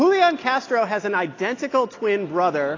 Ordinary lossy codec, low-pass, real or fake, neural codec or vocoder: AAC, 32 kbps; 7.2 kHz; real; none